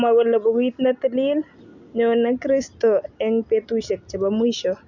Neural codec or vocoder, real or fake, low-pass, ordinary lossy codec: none; real; 7.2 kHz; none